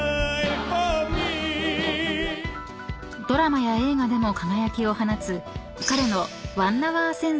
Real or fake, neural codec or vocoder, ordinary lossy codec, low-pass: real; none; none; none